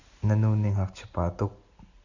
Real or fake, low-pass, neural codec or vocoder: real; 7.2 kHz; none